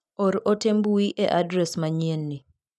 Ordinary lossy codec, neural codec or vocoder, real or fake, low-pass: none; none; real; none